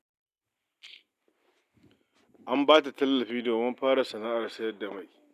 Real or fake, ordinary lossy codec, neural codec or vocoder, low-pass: real; none; none; 14.4 kHz